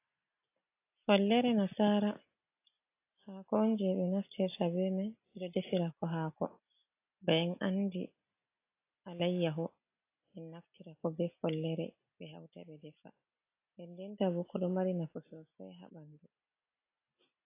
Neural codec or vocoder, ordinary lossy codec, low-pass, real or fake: none; AAC, 24 kbps; 3.6 kHz; real